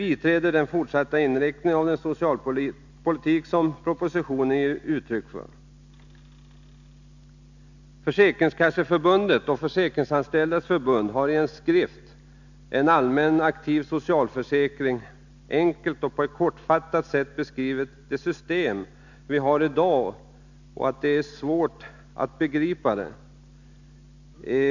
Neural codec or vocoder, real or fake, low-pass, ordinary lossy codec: none; real; 7.2 kHz; none